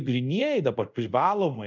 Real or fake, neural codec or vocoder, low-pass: fake; codec, 24 kHz, 0.5 kbps, DualCodec; 7.2 kHz